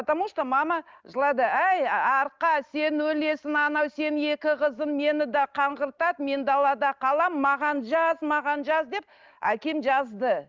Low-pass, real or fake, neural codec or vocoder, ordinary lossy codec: 7.2 kHz; real; none; Opus, 24 kbps